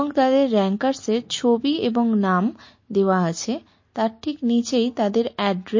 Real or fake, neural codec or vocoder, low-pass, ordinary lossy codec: real; none; 7.2 kHz; MP3, 32 kbps